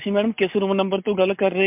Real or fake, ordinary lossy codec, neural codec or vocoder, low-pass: real; MP3, 32 kbps; none; 3.6 kHz